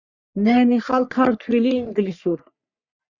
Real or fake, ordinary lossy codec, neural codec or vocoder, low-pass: fake; Opus, 64 kbps; codec, 44.1 kHz, 3.4 kbps, Pupu-Codec; 7.2 kHz